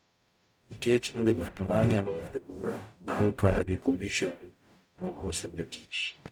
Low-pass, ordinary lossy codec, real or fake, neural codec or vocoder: none; none; fake; codec, 44.1 kHz, 0.9 kbps, DAC